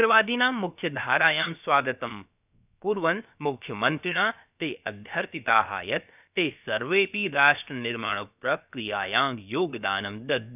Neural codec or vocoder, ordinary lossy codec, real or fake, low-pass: codec, 16 kHz, about 1 kbps, DyCAST, with the encoder's durations; none; fake; 3.6 kHz